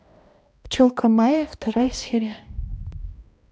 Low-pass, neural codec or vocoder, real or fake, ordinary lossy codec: none; codec, 16 kHz, 1 kbps, X-Codec, HuBERT features, trained on balanced general audio; fake; none